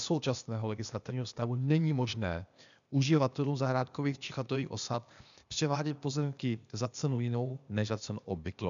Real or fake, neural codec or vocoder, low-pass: fake; codec, 16 kHz, 0.8 kbps, ZipCodec; 7.2 kHz